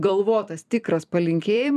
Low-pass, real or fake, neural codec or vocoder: 14.4 kHz; fake; vocoder, 44.1 kHz, 128 mel bands every 256 samples, BigVGAN v2